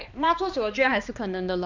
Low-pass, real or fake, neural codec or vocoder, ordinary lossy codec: 7.2 kHz; fake; codec, 16 kHz, 2 kbps, X-Codec, HuBERT features, trained on balanced general audio; none